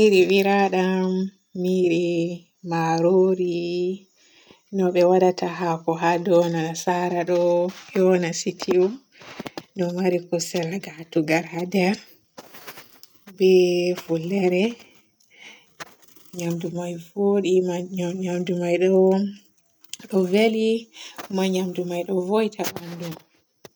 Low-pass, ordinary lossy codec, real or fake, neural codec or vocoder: none; none; real; none